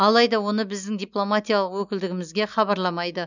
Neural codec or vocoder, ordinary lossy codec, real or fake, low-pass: none; none; real; 7.2 kHz